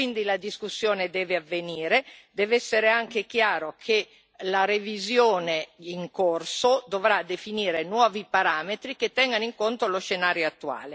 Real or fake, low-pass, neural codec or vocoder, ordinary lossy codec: real; none; none; none